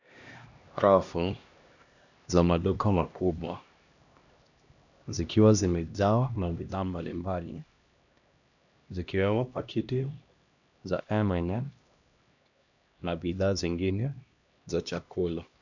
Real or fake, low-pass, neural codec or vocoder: fake; 7.2 kHz; codec, 16 kHz, 1 kbps, X-Codec, HuBERT features, trained on LibriSpeech